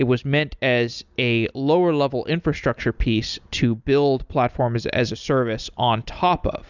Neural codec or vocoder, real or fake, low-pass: none; real; 7.2 kHz